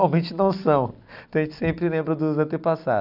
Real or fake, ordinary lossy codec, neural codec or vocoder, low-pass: real; none; none; 5.4 kHz